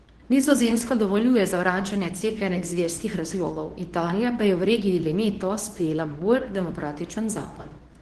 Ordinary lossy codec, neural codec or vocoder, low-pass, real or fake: Opus, 16 kbps; codec, 24 kHz, 0.9 kbps, WavTokenizer, medium speech release version 2; 10.8 kHz; fake